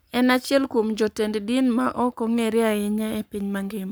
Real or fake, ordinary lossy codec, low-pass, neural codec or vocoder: fake; none; none; codec, 44.1 kHz, 7.8 kbps, Pupu-Codec